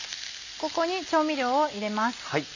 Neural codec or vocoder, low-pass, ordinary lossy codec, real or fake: none; 7.2 kHz; none; real